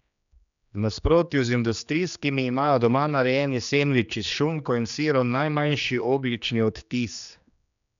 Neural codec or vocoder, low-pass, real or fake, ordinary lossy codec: codec, 16 kHz, 2 kbps, X-Codec, HuBERT features, trained on general audio; 7.2 kHz; fake; none